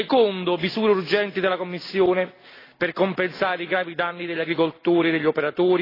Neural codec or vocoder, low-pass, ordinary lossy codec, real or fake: none; 5.4 kHz; AAC, 24 kbps; real